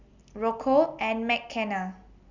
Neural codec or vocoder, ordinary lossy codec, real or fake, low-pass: none; none; real; 7.2 kHz